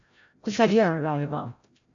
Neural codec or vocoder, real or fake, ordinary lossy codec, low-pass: codec, 16 kHz, 0.5 kbps, FreqCodec, larger model; fake; AAC, 64 kbps; 7.2 kHz